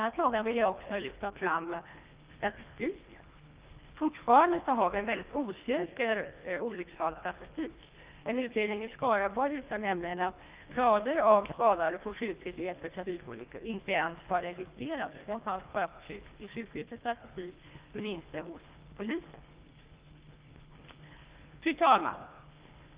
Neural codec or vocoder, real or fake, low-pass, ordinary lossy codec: codec, 24 kHz, 1.5 kbps, HILCodec; fake; 3.6 kHz; Opus, 24 kbps